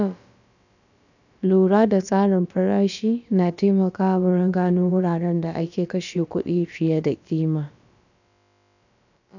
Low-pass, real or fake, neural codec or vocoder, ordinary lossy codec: 7.2 kHz; fake; codec, 16 kHz, about 1 kbps, DyCAST, with the encoder's durations; none